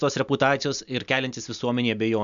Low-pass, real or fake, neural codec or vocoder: 7.2 kHz; real; none